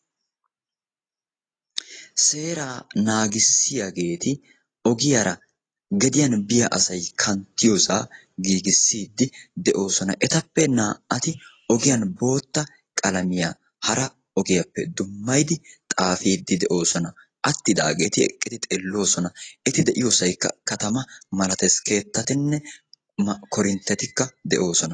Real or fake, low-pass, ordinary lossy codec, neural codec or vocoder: real; 9.9 kHz; AAC, 32 kbps; none